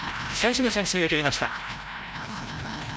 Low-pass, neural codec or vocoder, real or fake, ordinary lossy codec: none; codec, 16 kHz, 0.5 kbps, FreqCodec, larger model; fake; none